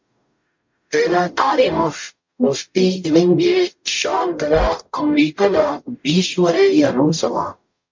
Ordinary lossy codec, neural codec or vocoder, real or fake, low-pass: MP3, 48 kbps; codec, 44.1 kHz, 0.9 kbps, DAC; fake; 7.2 kHz